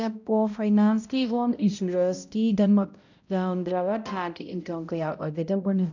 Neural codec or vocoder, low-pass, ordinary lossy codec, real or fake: codec, 16 kHz, 0.5 kbps, X-Codec, HuBERT features, trained on balanced general audio; 7.2 kHz; none; fake